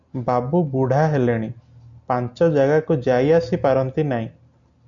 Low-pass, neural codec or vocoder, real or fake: 7.2 kHz; none; real